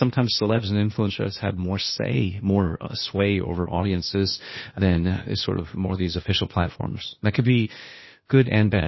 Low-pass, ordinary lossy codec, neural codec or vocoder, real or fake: 7.2 kHz; MP3, 24 kbps; codec, 16 kHz, 0.8 kbps, ZipCodec; fake